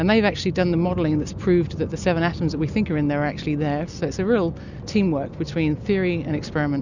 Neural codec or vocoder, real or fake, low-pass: none; real; 7.2 kHz